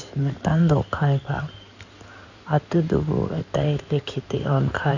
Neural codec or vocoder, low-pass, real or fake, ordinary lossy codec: codec, 16 kHz in and 24 kHz out, 2.2 kbps, FireRedTTS-2 codec; 7.2 kHz; fake; none